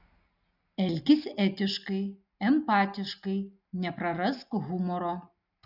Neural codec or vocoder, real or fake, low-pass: none; real; 5.4 kHz